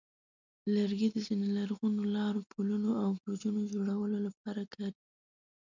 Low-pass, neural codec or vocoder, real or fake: 7.2 kHz; none; real